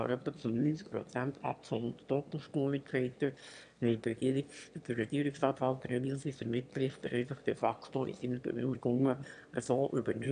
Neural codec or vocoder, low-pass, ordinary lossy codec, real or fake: autoencoder, 22.05 kHz, a latent of 192 numbers a frame, VITS, trained on one speaker; 9.9 kHz; MP3, 96 kbps; fake